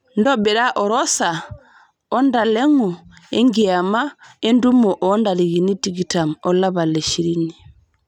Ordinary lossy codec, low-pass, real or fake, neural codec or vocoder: none; 19.8 kHz; real; none